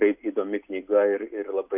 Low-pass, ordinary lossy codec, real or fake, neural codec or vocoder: 3.6 kHz; AAC, 32 kbps; real; none